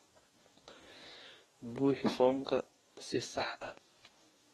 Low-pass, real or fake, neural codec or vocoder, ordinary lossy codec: 19.8 kHz; fake; codec, 44.1 kHz, 2.6 kbps, DAC; AAC, 32 kbps